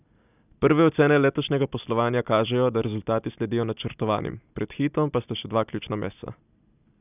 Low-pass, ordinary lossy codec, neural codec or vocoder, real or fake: 3.6 kHz; none; none; real